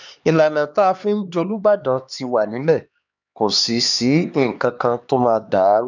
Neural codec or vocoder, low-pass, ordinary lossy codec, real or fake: codec, 16 kHz, 2 kbps, X-Codec, HuBERT features, trained on LibriSpeech; 7.2 kHz; none; fake